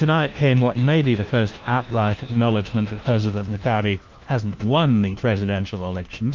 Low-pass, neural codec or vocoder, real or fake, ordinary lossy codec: 7.2 kHz; codec, 16 kHz, 1 kbps, FunCodec, trained on LibriTTS, 50 frames a second; fake; Opus, 32 kbps